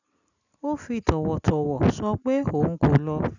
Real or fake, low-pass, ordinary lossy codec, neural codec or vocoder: real; 7.2 kHz; none; none